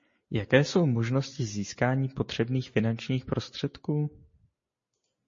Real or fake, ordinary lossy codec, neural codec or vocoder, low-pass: real; MP3, 32 kbps; none; 7.2 kHz